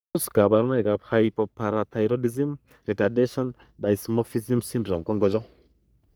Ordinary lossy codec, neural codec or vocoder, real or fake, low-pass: none; codec, 44.1 kHz, 3.4 kbps, Pupu-Codec; fake; none